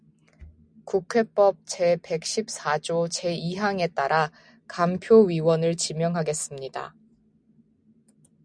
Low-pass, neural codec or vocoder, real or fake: 9.9 kHz; none; real